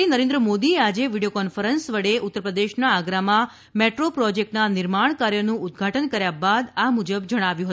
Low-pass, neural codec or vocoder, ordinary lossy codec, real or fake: none; none; none; real